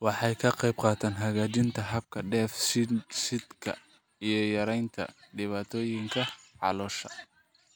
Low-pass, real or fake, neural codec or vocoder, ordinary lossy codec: none; real; none; none